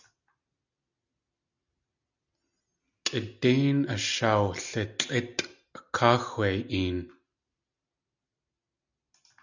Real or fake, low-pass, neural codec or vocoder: real; 7.2 kHz; none